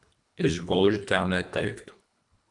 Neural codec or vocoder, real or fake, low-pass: codec, 24 kHz, 1.5 kbps, HILCodec; fake; 10.8 kHz